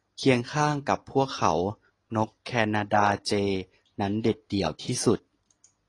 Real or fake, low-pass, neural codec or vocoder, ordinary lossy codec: real; 10.8 kHz; none; AAC, 32 kbps